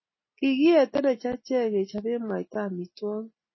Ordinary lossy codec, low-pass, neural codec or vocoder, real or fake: MP3, 24 kbps; 7.2 kHz; none; real